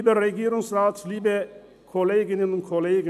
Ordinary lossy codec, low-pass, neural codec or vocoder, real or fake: none; 14.4 kHz; none; real